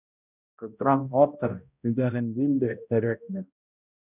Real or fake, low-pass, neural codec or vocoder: fake; 3.6 kHz; codec, 16 kHz, 0.5 kbps, X-Codec, HuBERT features, trained on balanced general audio